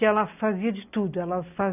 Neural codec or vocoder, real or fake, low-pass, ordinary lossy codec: none; real; 3.6 kHz; none